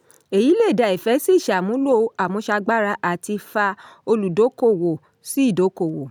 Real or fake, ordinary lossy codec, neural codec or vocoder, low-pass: real; none; none; none